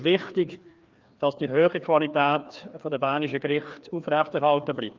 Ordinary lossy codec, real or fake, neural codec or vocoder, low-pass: Opus, 32 kbps; fake; codec, 16 kHz, 2 kbps, FreqCodec, larger model; 7.2 kHz